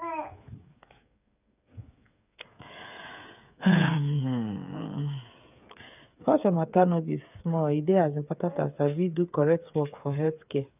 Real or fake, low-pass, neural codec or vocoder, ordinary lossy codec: fake; 3.6 kHz; codec, 16 kHz, 8 kbps, FreqCodec, smaller model; none